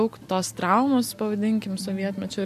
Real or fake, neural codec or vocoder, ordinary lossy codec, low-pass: real; none; MP3, 64 kbps; 14.4 kHz